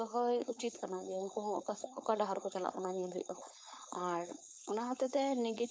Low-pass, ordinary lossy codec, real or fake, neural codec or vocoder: none; none; fake; codec, 16 kHz, 4.8 kbps, FACodec